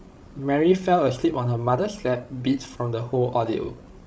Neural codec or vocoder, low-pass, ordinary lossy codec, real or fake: codec, 16 kHz, 16 kbps, FreqCodec, larger model; none; none; fake